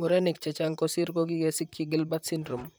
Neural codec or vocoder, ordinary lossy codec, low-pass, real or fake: vocoder, 44.1 kHz, 128 mel bands, Pupu-Vocoder; none; none; fake